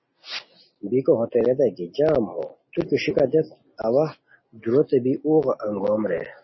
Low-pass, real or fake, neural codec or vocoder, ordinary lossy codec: 7.2 kHz; real; none; MP3, 24 kbps